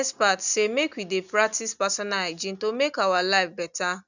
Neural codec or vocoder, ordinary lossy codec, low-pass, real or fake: none; none; 7.2 kHz; real